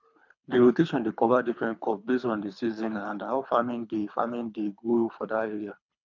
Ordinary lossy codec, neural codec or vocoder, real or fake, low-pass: none; codec, 24 kHz, 3 kbps, HILCodec; fake; 7.2 kHz